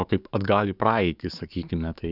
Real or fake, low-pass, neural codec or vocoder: fake; 5.4 kHz; codec, 44.1 kHz, 7.8 kbps, DAC